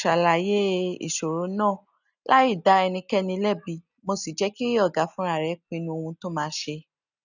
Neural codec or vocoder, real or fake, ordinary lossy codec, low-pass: none; real; none; 7.2 kHz